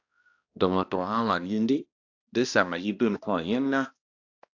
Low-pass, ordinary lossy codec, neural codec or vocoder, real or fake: 7.2 kHz; AAC, 48 kbps; codec, 16 kHz, 1 kbps, X-Codec, HuBERT features, trained on balanced general audio; fake